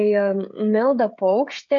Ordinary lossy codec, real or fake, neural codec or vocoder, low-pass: MP3, 64 kbps; fake; codec, 16 kHz, 16 kbps, FreqCodec, smaller model; 7.2 kHz